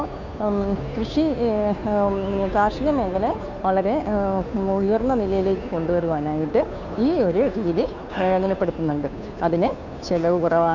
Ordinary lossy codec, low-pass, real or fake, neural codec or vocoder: none; 7.2 kHz; fake; codec, 16 kHz, 2 kbps, FunCodec, trained on Chinese and English, 25 frames a second